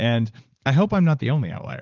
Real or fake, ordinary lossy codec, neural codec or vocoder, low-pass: fake; Opus, 24 kbps; vocoder, 44.1 kHz, 80 mel bands, Vocos; 7.2 kHz